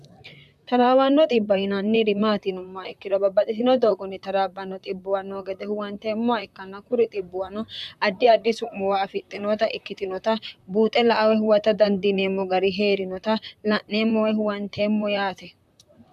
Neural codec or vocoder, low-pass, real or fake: vocoder, 44.1 kHz, 128 mel bands, Pupu-Vocoder; 14.4 kHz; fake